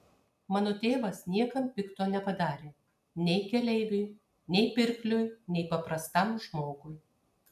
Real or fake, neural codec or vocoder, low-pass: real; none; 14.4 kHz